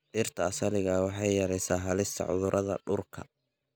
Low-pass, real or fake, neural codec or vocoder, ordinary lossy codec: none; real; none; none